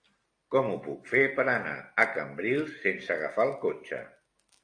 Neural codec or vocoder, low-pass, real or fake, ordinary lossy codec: none; 9.9 kHz; real; AAC, 48 kbps